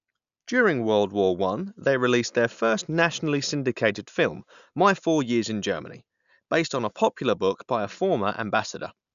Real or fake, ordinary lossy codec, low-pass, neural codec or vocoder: real; none; 7.2 kHz; none